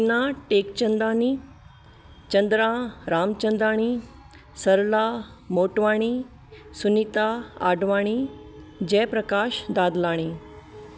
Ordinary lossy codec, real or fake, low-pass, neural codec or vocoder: none; real; none; none